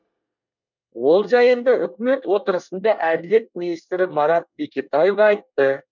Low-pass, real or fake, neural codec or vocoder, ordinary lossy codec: 7.2 kHz; fake; codec, 24 kHz, 1 kbps, SNAC; none